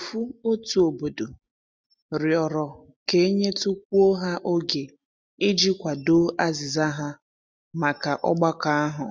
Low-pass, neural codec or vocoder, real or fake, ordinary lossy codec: none; none; real; none